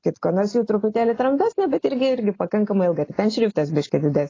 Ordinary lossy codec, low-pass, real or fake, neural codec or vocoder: AAC, 32 kbps; 7.2 kHz; real; none